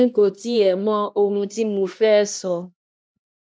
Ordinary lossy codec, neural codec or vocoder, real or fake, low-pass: none; codec, 16 kHz, 1 kbps, X-Codec, HuBERT features, trained on LibriSpeech; fake; none